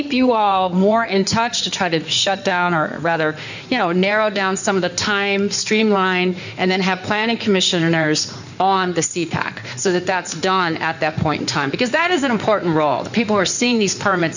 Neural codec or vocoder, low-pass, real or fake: codec, 16 kHz in and 24 kHz out, 2.2 kbps, FireRedTTS-2 codec; 7.2 kHz; fake